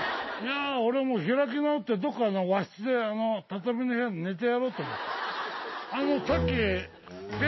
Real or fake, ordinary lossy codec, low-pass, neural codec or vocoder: real; MP3, 24 kbps; 7.2 kHz; none